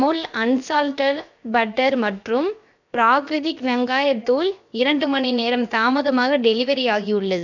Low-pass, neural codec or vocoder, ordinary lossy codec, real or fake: 7.2 kHz; codec, 16 kHz, about 1 kbps, DyCAST, with the encoder's durations; none; fake